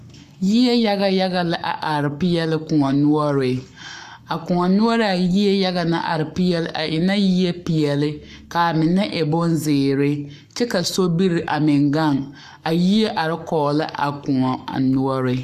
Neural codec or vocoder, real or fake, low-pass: codec, 44.1 kHz, 7.8 kbps, Pupu-Codec; fake; 14.4 kHz